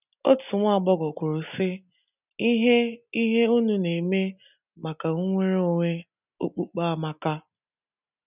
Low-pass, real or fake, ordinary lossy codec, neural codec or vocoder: 3.6 kHz; real; none; none